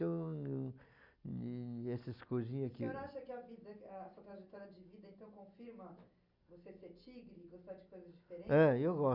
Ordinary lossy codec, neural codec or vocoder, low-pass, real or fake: Opus, 64 kbps; none; 5.4 kHz; real